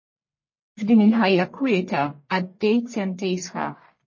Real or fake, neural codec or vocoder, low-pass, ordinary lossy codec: fake; codec, 44.1 kHz, 1.7 kbps, Pupu-Codec; 7.2 kHz; MP3, 32 kbps